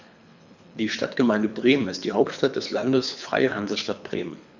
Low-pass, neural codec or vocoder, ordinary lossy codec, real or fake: 7.2 kHz; codec, 24 kHz, 3 kbps, HILCodec; none; fake